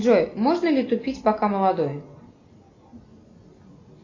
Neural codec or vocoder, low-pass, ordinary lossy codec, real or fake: none; 7.2 kHz; AAC, 32 kbps; real